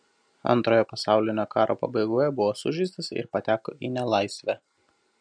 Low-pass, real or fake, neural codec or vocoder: 9.9 kHz; real; none